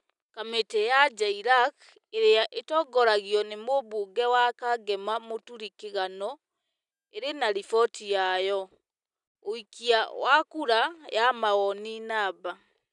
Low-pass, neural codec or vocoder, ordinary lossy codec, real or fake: 10.8 kHz; none; none; real